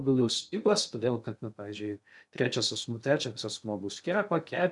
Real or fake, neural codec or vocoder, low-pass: fake; codec, 16 kHz in and 24 kHz out, 0.6 kbps, FocalCodec, streaming, 2048 codes; 10.8 kHz